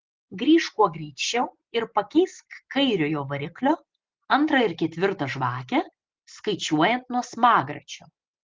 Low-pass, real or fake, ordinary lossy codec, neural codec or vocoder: 7.2 kHz; real; Opus, 16 kbps; none